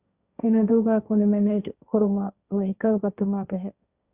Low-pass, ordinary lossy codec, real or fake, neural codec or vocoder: 3.6 kHz; Opus, 64 kbps; fake; codec, 16 kHz, 1.1 kbps, Voila-Tokenizer